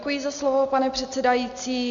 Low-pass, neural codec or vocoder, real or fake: 7.2 kHz; none; real